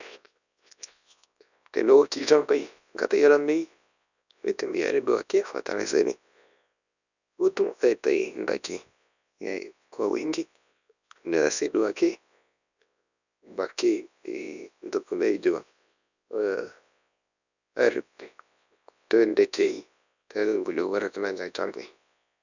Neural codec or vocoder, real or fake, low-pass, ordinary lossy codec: codec, 24 kHz, 0.9 kbps, WavTokenizer, large speech release; fake; 7.2 kHz; none